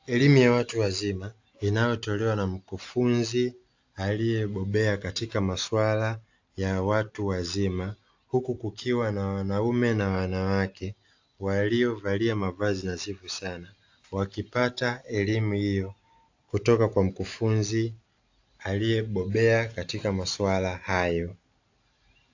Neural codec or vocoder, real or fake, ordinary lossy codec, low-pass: none; real; AAC, 48 kbps; 7.2 kHz